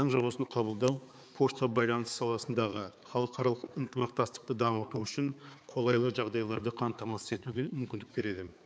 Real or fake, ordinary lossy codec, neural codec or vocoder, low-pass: fake; none; codec, 16 kHz, 4 kbps, X-Codec, HuBERT features, trained on balanced general audio; none